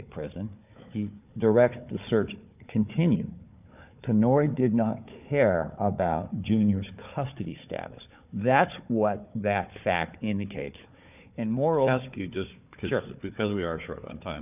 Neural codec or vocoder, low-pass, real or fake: codec, 16 kHz, 4 kbps, FunCodec, trained on Chinese and English, 50 frames a second; 3.6 kHz; fake